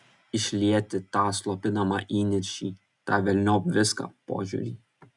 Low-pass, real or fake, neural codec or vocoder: 10.8 kHz; real; none